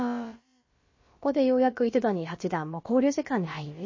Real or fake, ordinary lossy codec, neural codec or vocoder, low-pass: fake; MP3, 32 kbps; codec, 16 kHz, about 1 kbps, DyCAST, with the encoder's durations; 7.2 kHz